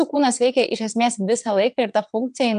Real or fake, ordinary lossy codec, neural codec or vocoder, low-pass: fake; AAC, 96 kbps; vocoder, 22.05 kHz, 80 mel bands, WaveNeXt; 9.9 kHz